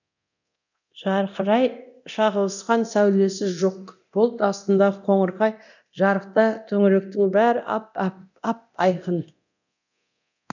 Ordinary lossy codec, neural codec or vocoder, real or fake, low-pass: none; codec, 24 kHz, 0.9 kbps, DualCodec; fake; 7.2 kHz